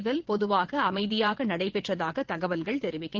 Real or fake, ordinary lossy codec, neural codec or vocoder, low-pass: fake; Opus, 16 kbps; vocoder, 22.05 kHz, 80 mel bands, WaveNeXt; 7.2 kHz